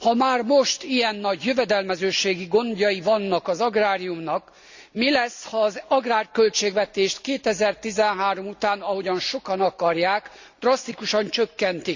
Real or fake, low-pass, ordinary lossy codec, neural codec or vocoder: real; 7.2 kHz; Opus, 64 kbps; none